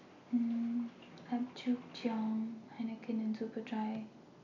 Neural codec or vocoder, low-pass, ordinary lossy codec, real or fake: none; 7.2 kHz; none; real